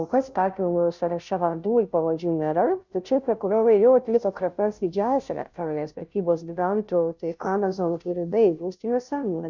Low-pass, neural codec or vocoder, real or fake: 7.2 kHz; codec, 16 kHz, 0.5 kbps, FunCodec, trained on Chinese and English, 25 frames a second; fake